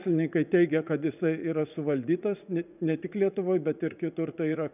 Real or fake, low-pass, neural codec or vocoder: fake; 3.6 kHz; vocoder, 44.1 kHz, 80 mel bands, Vocos